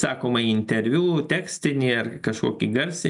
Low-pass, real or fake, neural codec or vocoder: 10.8 kHz; real; none